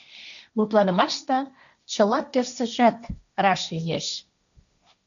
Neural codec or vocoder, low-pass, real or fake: codec, 16 kHz, 1.1 kbps, Voila-Tokenizer; 7.2 kHz; fake